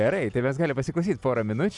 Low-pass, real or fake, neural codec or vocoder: 10.8 kHz; real; none